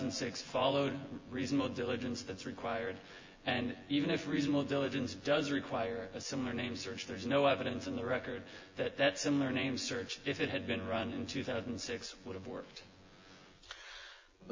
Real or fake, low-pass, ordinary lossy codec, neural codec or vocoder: fake; 7.2 kHz; MP3, 32 kbps; vocoder, 24 kHz, 100 mel bands, Vocos